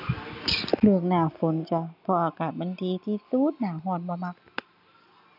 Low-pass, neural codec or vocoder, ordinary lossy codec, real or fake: 5.4 kHz; none; none; real